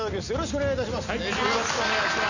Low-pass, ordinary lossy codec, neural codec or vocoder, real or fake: 7.2 kHz; none; none; real